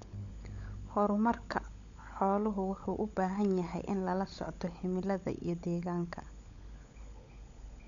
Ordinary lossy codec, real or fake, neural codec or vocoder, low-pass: none; fake; codec, 16 kHz, 16 kbps, FunCodec, trained on LibriTTS, 50 frames a second; 7.2 kHz